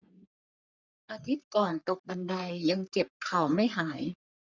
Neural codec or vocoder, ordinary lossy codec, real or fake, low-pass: codec, 44.1 kHz, 3.4 kbps, Pupu-Codec; none; fake; 7.2 kHz